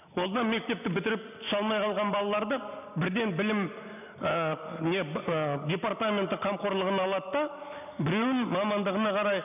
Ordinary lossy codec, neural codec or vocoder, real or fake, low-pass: none; none; real; 3.6 kHz